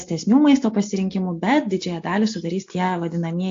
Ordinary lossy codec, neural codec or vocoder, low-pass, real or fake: AAC, 48 kbps; none; 7.2 kHz; real